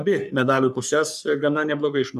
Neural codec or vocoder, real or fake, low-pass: codec, 44.1 kHz, 3.4 kbps, Pupu-Codec; fake; 14.4 kHz